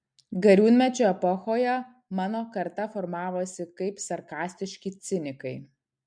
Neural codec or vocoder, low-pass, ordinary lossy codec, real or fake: none; 9.9 kHz; MP3, 64 kbps; real